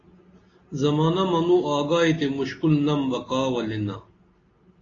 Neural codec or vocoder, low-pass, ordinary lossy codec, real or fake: none; 7.2 kHz; AAC, 32 kbps; real